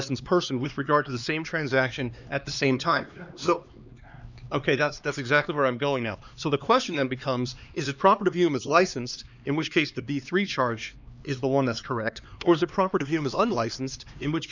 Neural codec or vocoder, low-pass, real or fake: codec, 16 kHz, 2 kbps, X-Codec, HuBERT features, trained on LibriSpeech; 7.2 kHz; fake